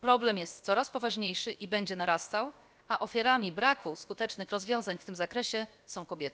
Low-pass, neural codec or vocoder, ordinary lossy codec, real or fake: none; codec, 16 kHz, about 1 kbps, DyCAST, with the encoder's durations; none; fake